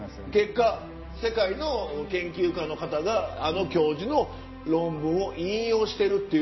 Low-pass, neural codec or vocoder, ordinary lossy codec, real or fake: 7.2 kHz; none; MP3, 24 kbps; real